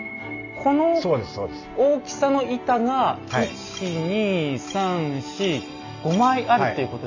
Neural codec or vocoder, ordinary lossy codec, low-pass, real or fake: none; none; 7.2 kHz; real